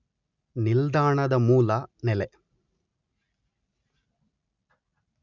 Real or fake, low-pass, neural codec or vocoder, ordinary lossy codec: real; 7.2 kHz; none; none